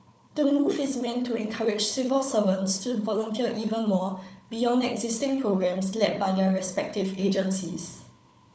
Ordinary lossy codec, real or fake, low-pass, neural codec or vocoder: none; fake; none; codec, 16 kHz, 4 kbps, FunCodec, trained on Chinese and English, 50 frames a second